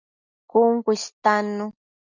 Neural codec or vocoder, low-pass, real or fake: none; 7.2 kHz; real